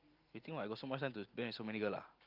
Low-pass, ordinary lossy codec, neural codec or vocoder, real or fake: 5.4 kHz; Opus, 24 kbps; none; real